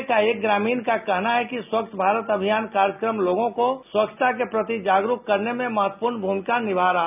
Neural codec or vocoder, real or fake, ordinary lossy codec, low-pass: none; real; none; 3.6 kHz